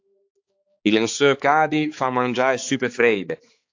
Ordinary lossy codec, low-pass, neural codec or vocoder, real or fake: AAC, 48 kbps; 7.2 kHz; codec, 16 kHz, 4 kbps, X-Codec, HuBERT features, trained on balanced general audio; fake